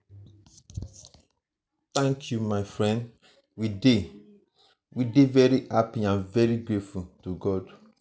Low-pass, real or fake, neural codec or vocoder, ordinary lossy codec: none; real; none; none